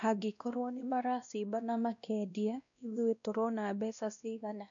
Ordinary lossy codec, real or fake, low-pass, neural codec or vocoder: none; fake; 7.2 kHz; codec, 16 kHz, 1 kbps, X-Codec, WavLM features, trained on Multilingual LibriSpeech